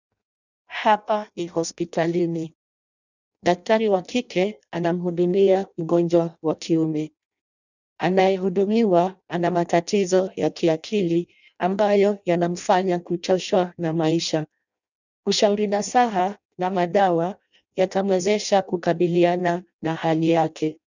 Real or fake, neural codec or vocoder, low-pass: fake; codec, 16 kHz in and 24 kHz out, 0.6 kbps, FireRedTTS-2 codec; 7.2 kHz